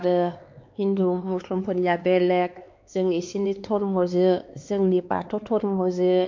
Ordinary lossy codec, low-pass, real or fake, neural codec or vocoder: MP3, 48 kbps; 7.2 kHz; fake; codec, 16 kHz, 4 kbps, X-Codec, HuBERT features, trained on LibriSpeech